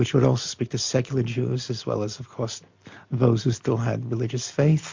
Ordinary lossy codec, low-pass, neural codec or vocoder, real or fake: MP3, 48 kbps; 7.2 kHz; none; real